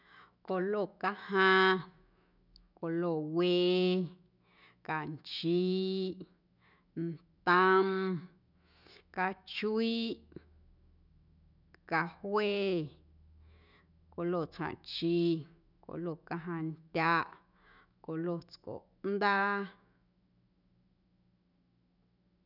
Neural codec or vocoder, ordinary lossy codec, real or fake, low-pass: autoencoder, 48 kHz, 128 numbers a frame, DAC-VAE, trained on Japanese speech; none; fake; 5.4 kHz